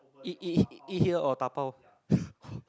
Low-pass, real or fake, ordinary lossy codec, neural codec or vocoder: none; real; none; none